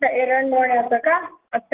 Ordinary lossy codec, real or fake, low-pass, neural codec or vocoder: Opus, 16 kbps; real; 3.6 kHz; none